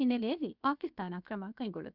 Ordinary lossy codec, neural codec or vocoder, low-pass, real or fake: none; codec, 16 kHz, 0.7 kbps, FocalCodec; 5.4 kHz; fake